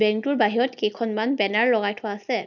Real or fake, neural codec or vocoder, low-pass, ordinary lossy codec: real; none; 7.2 kHz; none